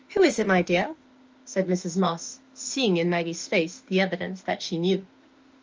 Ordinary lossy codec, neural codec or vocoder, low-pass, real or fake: Opus, 24 kbps; autoencoder, 48 kHz, 32 numbers a frame, DAC-VAE, trained on Japanese speech; 7.2 kHz; fake